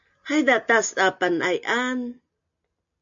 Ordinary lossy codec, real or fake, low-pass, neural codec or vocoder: MP3, 64 kbps; real; 7.2 kHz; none